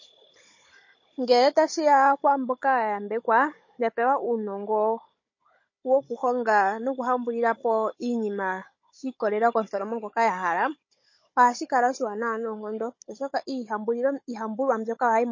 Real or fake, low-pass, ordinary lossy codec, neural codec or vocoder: fake; 7.2 kHz; MP3, 32 kbps; codec, 16 kHz, 16 kbps, FunCodec, trained on Chinese and English, 50 frames a second